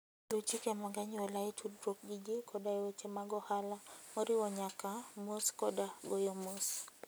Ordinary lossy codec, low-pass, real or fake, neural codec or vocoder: none; none; real; none